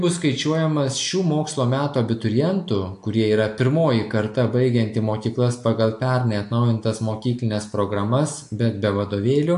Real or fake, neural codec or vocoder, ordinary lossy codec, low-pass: real; none; AAC, 64 kbps; 10.8 kHz